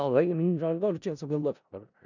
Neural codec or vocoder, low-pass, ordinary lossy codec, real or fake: codec, 16 kHz in and 24 kHz out, 0.4 kbps, LongCat-Audio-Codec, four codebook decoder; 7.2 kHz; none; fake